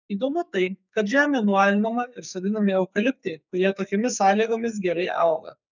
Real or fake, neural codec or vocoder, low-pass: fake; codec, 44.1 kHz, 2.6 kbps, SNAC; 7.2 kHz